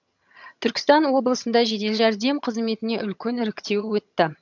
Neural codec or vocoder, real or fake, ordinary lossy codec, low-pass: vocoder, 22.05 kHz, 80 mel bands, HiFi-GAN; fake; none; 7.2 kHz